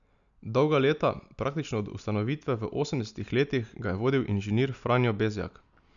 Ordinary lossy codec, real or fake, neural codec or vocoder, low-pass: none; real; none; 7.2 kHz